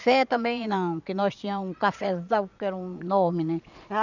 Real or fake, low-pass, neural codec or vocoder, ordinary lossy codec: fake; 7.2 kHz; vocoder, 22.05 kHz, 80 mel bands, Vocos; none